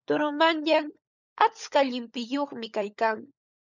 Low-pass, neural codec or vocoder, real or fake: 7.2 kHz; codec, 16 kHz, 16 kbps, FunCodec, trained on LibriTTS, 50 frames a second; fake